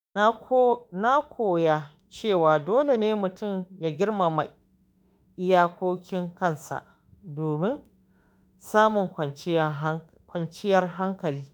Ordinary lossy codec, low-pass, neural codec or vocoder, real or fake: none; none; autoencoder, 48 kHz, 32 numbers a frame, DAC-VAE, trained on Japanese speech; fake